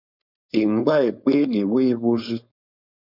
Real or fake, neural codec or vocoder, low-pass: fake; codec, 16 kHz, 4.8 kbps, FACodec; 5.4 kHz